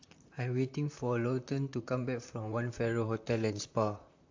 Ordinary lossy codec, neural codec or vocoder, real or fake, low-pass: AAC, 48 kbps; vocoder, 44.1 kHz, 128 mel bands, Pupu-Vocoder; fake; 7.2 kHz